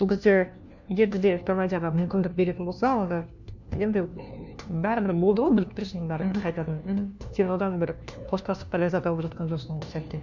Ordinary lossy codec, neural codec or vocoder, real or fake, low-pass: none; codec, 16 kHz, 1 kbps, FunCodec, trained on LibriTTS, 50 frames a second; fake; 7.2 kHz